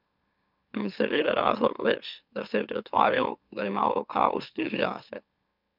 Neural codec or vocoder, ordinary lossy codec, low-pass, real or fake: autoencoder, 44.1 kHz, a latent of 192 numbers a frame, MeloTTS; none; 5.4 kHz; fake